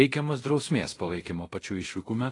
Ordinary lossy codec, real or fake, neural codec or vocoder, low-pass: AAC, 32 kbps; fake; codec, 24 kHz, 0.5 kbps, DualCodec; 10.8 kHz